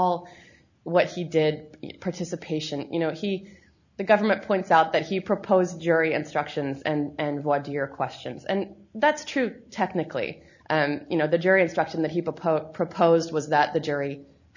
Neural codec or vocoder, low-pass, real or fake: none; 7.2 kHz; real